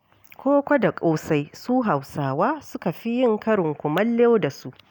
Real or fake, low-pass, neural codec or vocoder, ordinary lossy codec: real; none; none; none